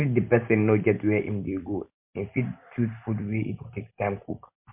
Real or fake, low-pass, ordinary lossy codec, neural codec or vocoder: real; 3.6 kHz; MP3, 32 kbps; none